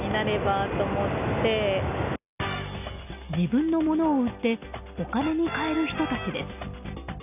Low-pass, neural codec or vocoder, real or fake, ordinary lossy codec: 3.6 kHz; none; real; none